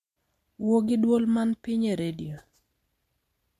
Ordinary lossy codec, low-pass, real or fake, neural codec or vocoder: MP3, 64 kbps; 14.4 kHz; real; none